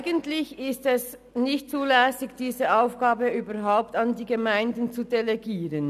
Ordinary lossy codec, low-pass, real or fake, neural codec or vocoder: none; 14.4 kHz; real; none